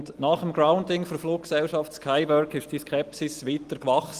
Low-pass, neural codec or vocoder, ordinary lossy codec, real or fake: 14.4 kHz; none; Opus, 24 kbps; real